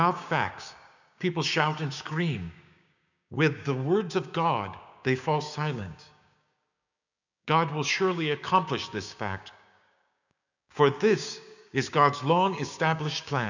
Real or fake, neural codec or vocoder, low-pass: fake; codec, 16 kHz, 6 kbps, DAC; 7.2 kHz